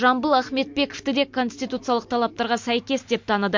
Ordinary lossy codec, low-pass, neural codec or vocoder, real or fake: MP3, 48 kbps; 7.2 kHz; none; real